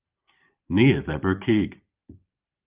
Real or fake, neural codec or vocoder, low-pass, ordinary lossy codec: fake; vocoder, 44.1 kHz, 128 mel bands every 512 samples, BigVGAN v2; 3.6 kHz; Opus, 32 kbps